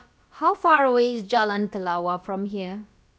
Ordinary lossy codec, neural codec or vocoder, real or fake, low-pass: none; codec, 16 kHz, about 1 kbps, DyCAST, with the encoder's durations; fake; none